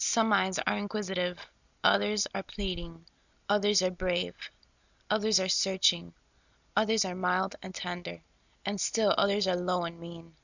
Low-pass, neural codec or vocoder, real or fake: 7.2 kHz; none; real